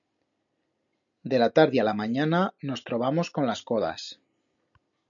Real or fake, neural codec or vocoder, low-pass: real; none; 7.2 kHz